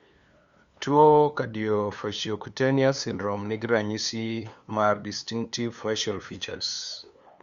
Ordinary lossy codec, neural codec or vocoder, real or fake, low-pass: Opus, 64 kbps; codec, 16 kHz, 2 kbps, FunCodec, trained on LibriTTS, 25 frames a second; fake; 7.2 kHz